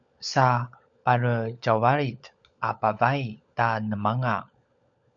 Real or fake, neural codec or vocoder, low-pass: fake; codec, 16 kHz, 16 kbps, FunCodec, trained on LibriTTS, 50 frames a second; 7.2 kHz